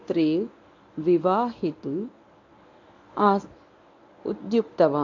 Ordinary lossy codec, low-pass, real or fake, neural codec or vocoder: AAC, 48 kbps; 7.2 kHz; fake; codec, 24 kHz, 0.9 kbps, WavTokenizer, medium speech release version 1